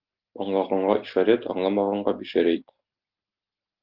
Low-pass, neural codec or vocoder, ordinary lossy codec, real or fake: 5.4 kHz; none; Opus, 32 kbps; real